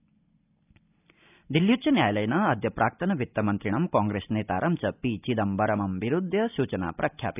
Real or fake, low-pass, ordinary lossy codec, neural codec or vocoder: real; 3.6 kHz; none; none